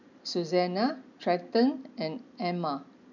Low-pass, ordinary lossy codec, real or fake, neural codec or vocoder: 7.2 kHz; none; real; none